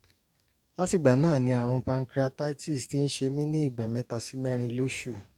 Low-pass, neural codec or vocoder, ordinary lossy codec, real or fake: 19.8 kHz; codec, 44.1 kHz, 2.6 kbps, DAC; none; fake